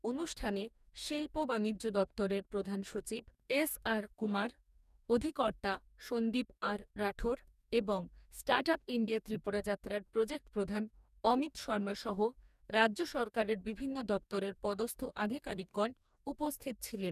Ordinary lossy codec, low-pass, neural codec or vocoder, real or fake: none; 14.4 kHz; codec, 44.1 kHz, 2.6 kbps, DAC; fake